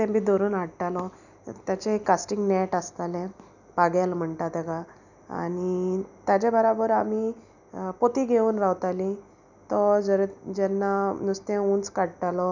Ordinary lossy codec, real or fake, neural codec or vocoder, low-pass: none; real; none; 7.2 kHz